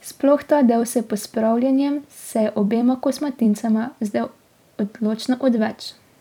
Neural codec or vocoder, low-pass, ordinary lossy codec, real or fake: vocoder, 44.1 kHz, 128 mel bands every 256 samples, BigVGAN v2; 19.8 kHz; none; fake